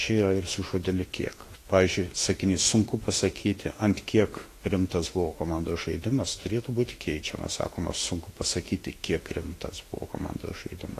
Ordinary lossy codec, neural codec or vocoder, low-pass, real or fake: AAC, 48 kbps; autoencoder, 48 kHz, 32 numbers a frame, DAC-VAE, trained on Japanese speech; 14.4 kHz; fake